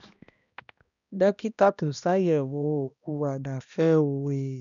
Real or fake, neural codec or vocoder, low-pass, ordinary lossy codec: fake; codec, 16 kHz, 1 kbps, X-Codec, HuBERT features, trained on balanced general audio; 7.2 kHz; none